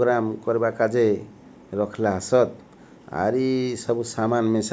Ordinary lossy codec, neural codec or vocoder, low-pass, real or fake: none; none; none; real